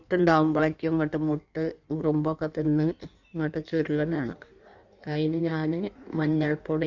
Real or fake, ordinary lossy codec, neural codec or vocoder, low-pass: fake; none; codec, 16 kHz in and 24 kHz out, 1.1 kbps, FireRedTTS-2 codec; 7.2 kHz